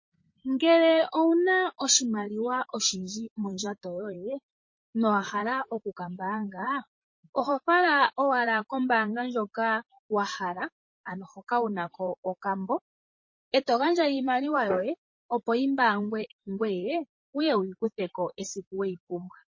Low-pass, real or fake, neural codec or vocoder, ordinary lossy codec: 7.2 kHz; fake; vocoder, 44.1 kHz, 128 mel bands, Pupu-Vocoder; MP3, 32 kbps